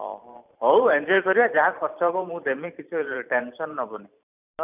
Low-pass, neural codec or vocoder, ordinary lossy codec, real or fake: 3.6 kHz; vocoder, 44.1 kHz, 128 mel bands every 512 samples, BigVGAN v2; none; fake